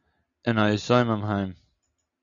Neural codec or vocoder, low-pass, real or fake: none; 7.2 kHz; real